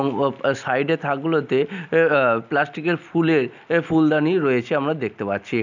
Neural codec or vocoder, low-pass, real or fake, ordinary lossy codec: none; 7.2 kHz; real; none